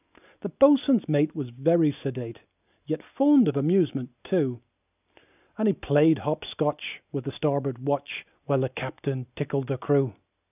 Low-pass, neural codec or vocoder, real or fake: 3.6 kHz; none; real